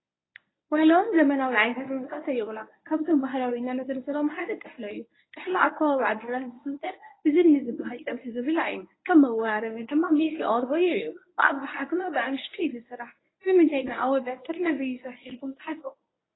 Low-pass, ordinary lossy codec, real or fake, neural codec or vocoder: 7.2 kHz; AAC, 16 kbps; fake; codec, 24 kHz, 0.9 kbps, WavTokenizer, medium speech release version 1